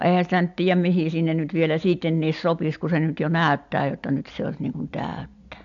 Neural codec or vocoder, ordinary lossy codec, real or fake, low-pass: none; AAC, 64 kbps; real; 7.2 kHz